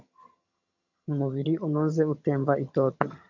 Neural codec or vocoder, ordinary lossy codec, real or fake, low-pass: vocoder, 22.05 kHz, 80 mel bands, HiFi-GAN; MP3, 64 kbps; fake; 7.2 kHz